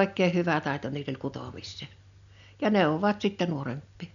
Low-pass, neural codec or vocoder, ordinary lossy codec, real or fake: 7.2 kHz; none; none; real